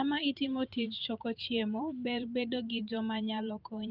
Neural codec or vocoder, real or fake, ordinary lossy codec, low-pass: vocoder, 44.1 kHz, 128 mel bands every 512 samples, BigVGAN v2; fake; Opus, 24 kbps; 5.4 kHz